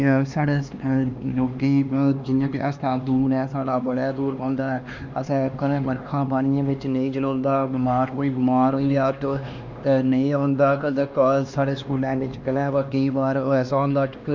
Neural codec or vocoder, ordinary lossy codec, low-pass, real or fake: codec, 16 kHz, 2 kbps, X-Codec, HuBERT features, trained on LibriSpeech; MP3, 64 kbps; 7.2 kHz; fake